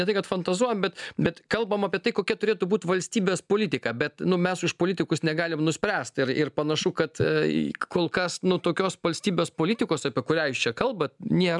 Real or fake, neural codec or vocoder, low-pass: real; none; 10.8 kHz